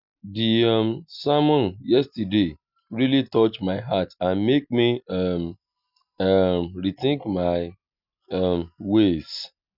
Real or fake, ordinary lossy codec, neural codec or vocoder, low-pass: real; none; none; 5.4 kHz